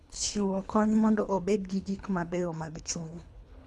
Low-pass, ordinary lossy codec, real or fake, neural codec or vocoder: none; none; fake; codec, 24 kHz, 3 kbps, HILCodec